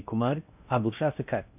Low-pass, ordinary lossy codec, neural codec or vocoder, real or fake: 3.6 kHz; none; codec, 16 kHz in and 24 kHz out, 0.6 kbps, FocalCodec, streaming, 2048 codes; fake